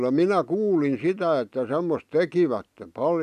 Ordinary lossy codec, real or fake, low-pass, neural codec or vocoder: none; real; 14.4 kHz; none